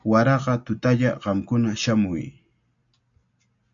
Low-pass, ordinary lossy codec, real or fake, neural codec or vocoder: 7.2 kHz; AAC, 64 kbps; real; none